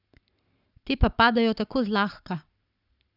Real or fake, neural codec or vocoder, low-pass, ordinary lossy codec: fake; codec, 44.1 kHz, 7.8 kbps, Pupu-Codec; 5.4 kHz; none